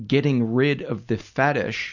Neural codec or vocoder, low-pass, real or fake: none; 7.2 kHz; real